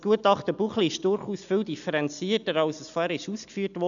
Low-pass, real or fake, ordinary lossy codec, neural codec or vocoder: 7.2 kHz; real; none; none